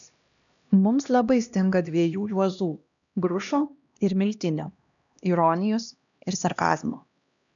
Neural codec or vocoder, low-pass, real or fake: codec, 16 kHz, 1 kbps, X-Codec, HuBERT features, trained on LibriSpeech; 7.2 kHz; fake